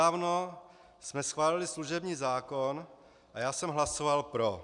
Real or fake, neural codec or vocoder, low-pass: real; none; 10.8 kHz